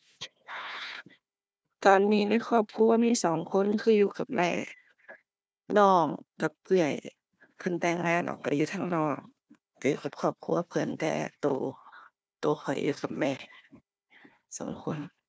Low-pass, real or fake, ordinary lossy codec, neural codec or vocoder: none; fake; none; codec, 16 kHz, 1 kbps, FunCodec, trained on Chinese and English, 50 frames a second